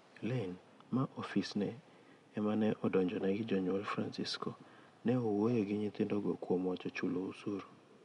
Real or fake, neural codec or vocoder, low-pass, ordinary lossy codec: real; none; 10.8 kHz; MP3, 64 kbps